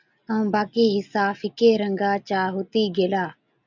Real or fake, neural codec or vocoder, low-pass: real; none; 7.2 kHz